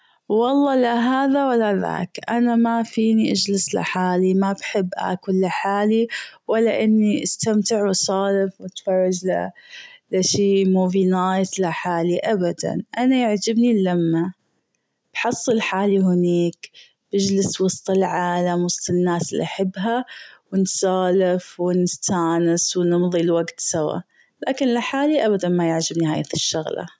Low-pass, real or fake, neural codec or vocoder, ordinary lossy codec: none; real; none; none